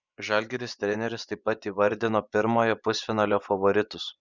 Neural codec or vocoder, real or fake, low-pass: vocoder, 24 kHz, 100 mel bands, Vocos; fake; 7.2 kHz